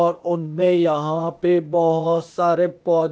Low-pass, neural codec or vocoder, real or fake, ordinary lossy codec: none; codec, 16 kHz, 0.8 kbps, ZipCodec; fake; none